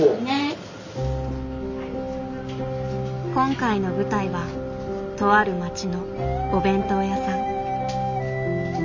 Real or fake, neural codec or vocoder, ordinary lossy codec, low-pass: real; none; none; 7.2 kHz